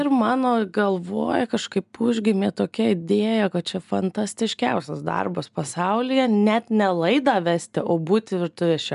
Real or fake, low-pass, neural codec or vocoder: real; 10.8 kHz; none